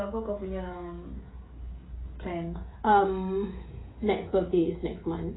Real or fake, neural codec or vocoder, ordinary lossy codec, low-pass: fake; codec, 16 kHz, 16 kbps, FreqCodec, smaller model; AAC, 16 kbps; 7.2 kHz